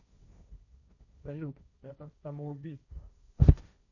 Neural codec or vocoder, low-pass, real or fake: codec, 16 kHz, 1.1 kbps, Voila-Tokenizer; 7.2 kHz; fake